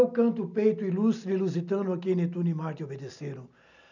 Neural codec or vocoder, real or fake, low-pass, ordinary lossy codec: none; real; 7.2 kHz; none